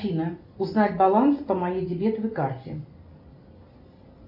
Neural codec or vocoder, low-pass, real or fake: none; 5.4 kHz; real